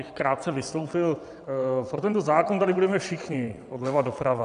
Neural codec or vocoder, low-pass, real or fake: vocoder, 22.05 kHz, 80 mel bands, WaveNeXt; 9.9 kHz; fake